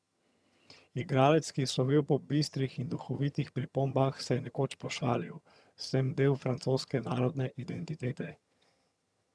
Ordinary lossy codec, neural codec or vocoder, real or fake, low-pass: none; vocoder, 22.05 kHz, 80 mel bands, HiFi-GAN; fake; none